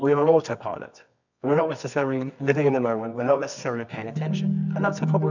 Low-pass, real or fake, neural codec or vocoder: 7.2 kHz; fake; codec, 24 kHz, 0.9 kbps, WavTokenizer, medium music audio release